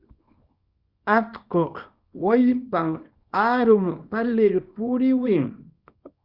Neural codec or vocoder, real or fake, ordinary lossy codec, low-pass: codec, 24 kHz, 0.9 kbps, WavTokenizer, small release; fake; Opus, 64 kbps; 5.4 kHz